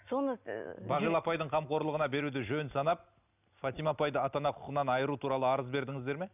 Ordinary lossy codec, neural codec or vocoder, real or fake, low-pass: none; none; real; 3.6 kHz